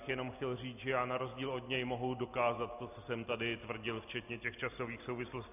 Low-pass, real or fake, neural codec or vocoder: 3.6 kHz; real; none